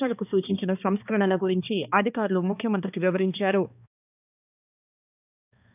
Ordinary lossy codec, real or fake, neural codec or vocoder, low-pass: none; fake; codec, 16 kHz, 2 kbps, X-Codec, HuBERT features, trained on balanced general audio; 3.6 kHz